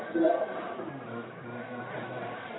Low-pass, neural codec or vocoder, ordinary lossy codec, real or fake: 7.2 kHz; codec, 44.1 kHz, 1.7 kbps, Pupu-Codec; AAC, 16 kbps; fake